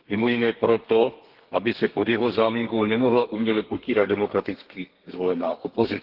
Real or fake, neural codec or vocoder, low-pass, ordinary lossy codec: fake; codec, 32 kHz, 1.9 kbps, SNAC; 5.4 kHz; Opus, 16 kbps